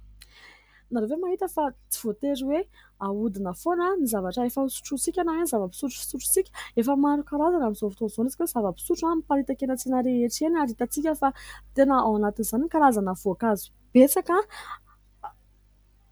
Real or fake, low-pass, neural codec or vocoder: real; 19.8 kHz; none